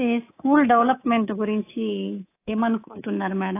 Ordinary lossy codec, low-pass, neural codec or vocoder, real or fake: AAC, 24 kbps; 3.6 kHz; codec, 24 kHz, 3.1 kbps, DualCodec; fake